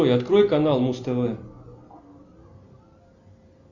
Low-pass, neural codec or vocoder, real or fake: 7.2 kHz; none; real